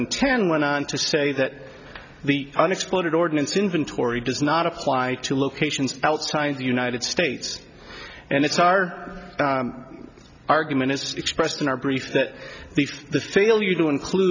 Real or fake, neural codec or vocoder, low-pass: real; none; 7.2 kHz